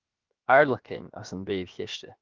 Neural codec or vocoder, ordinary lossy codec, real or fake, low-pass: codec, 16 kHz, 0.7 kbps, FocalCodec; Opus, 24 kbps; fake; 7.2 kHz